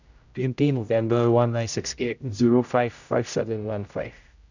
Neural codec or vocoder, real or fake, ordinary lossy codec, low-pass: codec, 16 kHz, 0.5 kbps, X-Codec, HuBERT features, trained on general audio; fake; none; 7.2 kHz